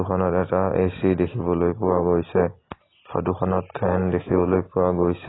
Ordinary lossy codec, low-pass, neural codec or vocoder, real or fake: AAC, 16 kbps; 7.2 kHz; none; real